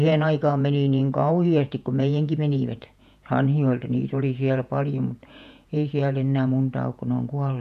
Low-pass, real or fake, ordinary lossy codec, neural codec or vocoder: 14.4 kHz; fake; none; vocoder, 48 kHz, 128 mel bands, Vocos